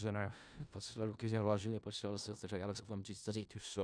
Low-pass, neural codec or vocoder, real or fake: 9.9 kHz; codec, 16 kHz in and 24 kHz out, 0.4 kbps, LongCat-Audio-Codec, four codebook decoder; fake